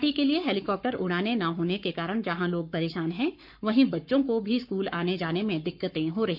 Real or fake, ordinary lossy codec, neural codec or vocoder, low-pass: fake; none; codec, 44.1 kHz, 7.8 kbps, Pupu-Codec; 5.4 kHz